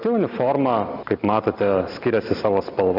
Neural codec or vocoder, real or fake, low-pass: none; real; 5.4 kHz